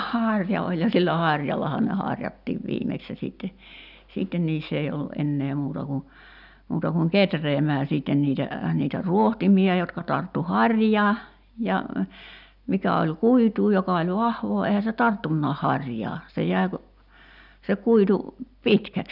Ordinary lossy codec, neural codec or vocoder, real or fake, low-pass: none; none; real; 5.4 kHz